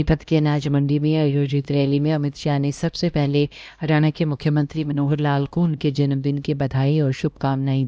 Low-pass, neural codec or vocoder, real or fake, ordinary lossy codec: none; codec, 16 kHz, 1 kbps, X-Codec, HuBERT features, trained on LibriSpeech; fake; none